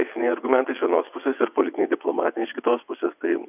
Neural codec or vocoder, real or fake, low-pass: vocoder, 22.05 kHz, 80 mel bands, WaveNeXt; fake; 3.6 kHz